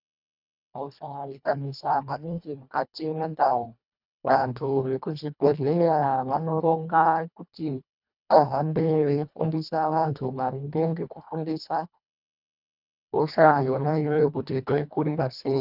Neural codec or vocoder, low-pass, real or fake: codec, 24 kHz, 1.5 kbps, HILCodec; 5.4 kHz; fake